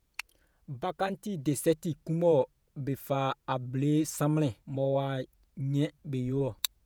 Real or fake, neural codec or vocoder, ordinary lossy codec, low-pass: fake; vocoder, 48 kHz, 128 mel bands, Vocos; none; none